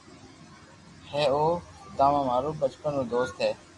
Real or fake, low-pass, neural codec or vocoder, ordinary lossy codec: real; 10.8 kHz; none; AAC, 32 kbps